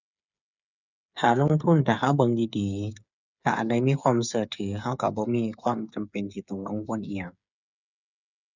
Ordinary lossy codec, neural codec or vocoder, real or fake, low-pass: none; codec, 16 kHz, 8 kbps, FreqCodec, smaller model; fake; 7.2 kHz